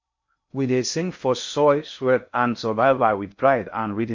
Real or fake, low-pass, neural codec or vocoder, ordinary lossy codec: fake; 7.2 kHz; codec, 16 kHz in and 24 kHz out, 0.6 kbps, FocalCodec, streaming, 2048 codes; MP3, 48 kbps